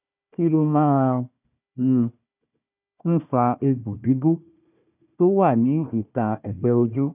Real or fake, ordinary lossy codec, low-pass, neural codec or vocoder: fake; none; 3.6 kHz; codec, 16 kHz, 1 kbps, FunCodec, trained on Chinese and English, 50 frames a second